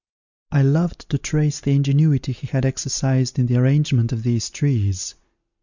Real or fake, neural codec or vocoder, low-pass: real; none; 7.2 kHz